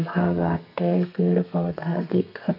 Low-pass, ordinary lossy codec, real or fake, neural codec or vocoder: 5.4 kHz; none; fake; codec, 32 kHz, 1.9 kbps, SNAC